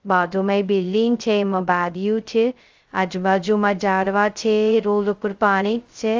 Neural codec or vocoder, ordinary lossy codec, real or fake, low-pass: codec, 16 kHz, 0.2 kbps, FocalCodec; Opus, 32 kbps; fake; 7.2 kHz